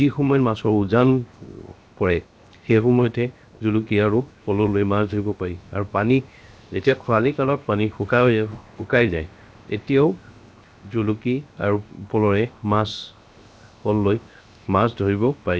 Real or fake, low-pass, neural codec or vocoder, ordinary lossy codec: fake; none; codec, 16 kHz, 0.7 kbps, FocalCodec; none